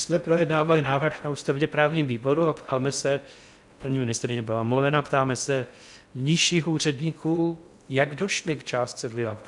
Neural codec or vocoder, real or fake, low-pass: codec, 16 kHz in and 24 kHz out, 0.6 kbps, FocalCodec, streaming, 4096 codes; fake; 10.8 kHz